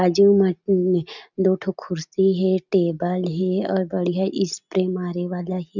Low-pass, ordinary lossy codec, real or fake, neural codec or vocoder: 7.2 kHz; none; real; none